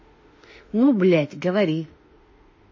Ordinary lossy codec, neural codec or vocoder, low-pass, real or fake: MP3, 32 kbps; autoencoder, 48 kHz, 32 numbers a frame, DAC-VAE, trained on Japanese speech; 7.2 kHz; fake